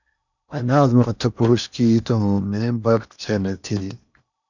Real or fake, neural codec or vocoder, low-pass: fake; codec, 16 kHz in and 24 kHz out, 0.8 kbps, FocalCodec, streaming, 65536 codes; 7.2 kHz